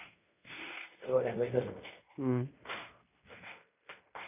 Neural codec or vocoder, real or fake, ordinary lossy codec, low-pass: codec, 16 kHz in and 24 kHz out, 0.9 kbps, LongCat-Audio-Codec, fine tuned four codebook decoder; fake; none; 3.6 kHz